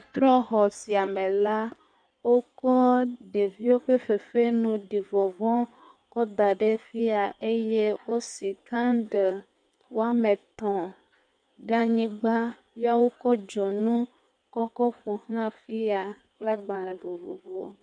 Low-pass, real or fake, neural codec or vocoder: 9.9 kHz; fake; codec, 16 kHz in and 24 kHz out, 1.1 kbps, FireRedTTS-2 codec